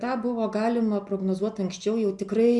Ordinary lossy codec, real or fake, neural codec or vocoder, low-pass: MP3, 64 kbps; real; none; 10.8 kHz